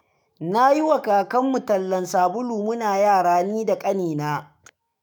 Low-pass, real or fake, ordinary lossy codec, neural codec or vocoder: none; fake; none; autoencoder, 48 kHz, 128 numbers a frame, DAC-VAE, trained on Japanese speech